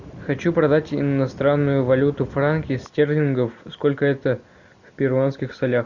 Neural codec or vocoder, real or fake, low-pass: none; real; 7.2 kHz